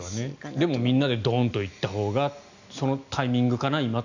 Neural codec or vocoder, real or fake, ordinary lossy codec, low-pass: none; real; none; 7.2 kHz